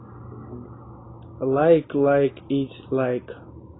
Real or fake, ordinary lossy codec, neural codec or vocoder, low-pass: real; AAC, 16 kbps; none; 7.2 kHz